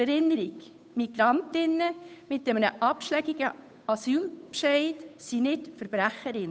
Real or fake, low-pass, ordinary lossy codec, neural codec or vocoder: fake; none; none; codec, 16 kHz, 8 kbps, FunCodec, trained on Chinese and English, 25 frames a second